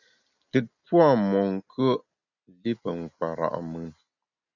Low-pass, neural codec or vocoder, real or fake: 7.2 kHz; none; real